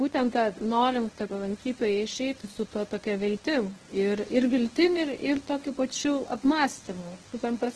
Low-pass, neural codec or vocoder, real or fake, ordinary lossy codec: 10.8 kHz; codec, 24 kHz, 0.9 kbps, WavTokenizer, medium speech release version 1; fake; Opus, 16 kbps